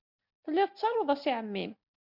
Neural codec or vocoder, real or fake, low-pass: none; real; 5.4 kHz